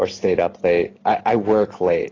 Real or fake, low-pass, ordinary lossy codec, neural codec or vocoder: real; 7.2 kHz; AAC, 32 kbps; none